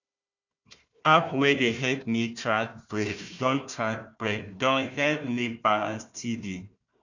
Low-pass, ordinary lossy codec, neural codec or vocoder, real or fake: 7.2 kHz; none; codec, 16 kHz, 1 kbps, FunCodec, trained on Chinese and English, 50 frames a second; fake